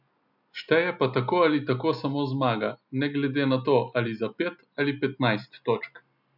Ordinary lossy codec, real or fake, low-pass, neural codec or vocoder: none; real; 5.4 kHz; none